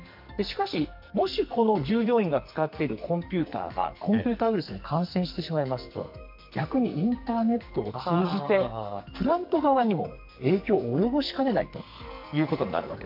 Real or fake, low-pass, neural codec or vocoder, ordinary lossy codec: fake; 5.4 kHz; codec, 44.1 kHz, 2.6 kbps, SNAC; MP3, 48 kbps